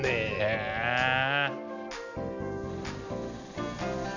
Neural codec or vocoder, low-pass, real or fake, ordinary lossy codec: none; 7.2 kHz; real; none